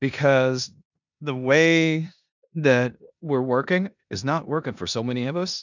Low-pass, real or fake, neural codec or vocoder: 7.2 kHz; fake; codec, 16 kHz in and 24 kHz out, 0.9 kbps, LongCat-Audio-Codec, four codebook decoder